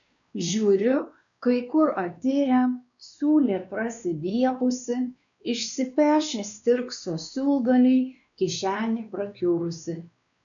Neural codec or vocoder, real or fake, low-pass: codec, 16 kHz, 2 kbps, X-Codec, WavLM features, trained on Multilingual LibriSpeech; fake; 7.2 kHz